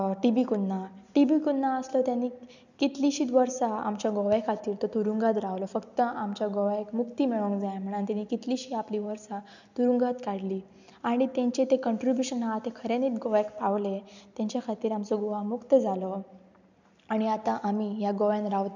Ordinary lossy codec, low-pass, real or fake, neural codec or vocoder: none; 7.2 kHz; real; none